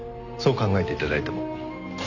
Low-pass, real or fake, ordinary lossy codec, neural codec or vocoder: 7.2 kHz; real; none; none